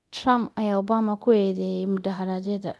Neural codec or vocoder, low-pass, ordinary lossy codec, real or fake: codec, 24 kHz, 0.9 kbps, DualCodec; none; none; fake